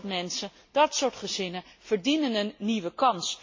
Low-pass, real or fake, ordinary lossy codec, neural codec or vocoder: 7.2 kHz; real; MP3, 32 kbps; none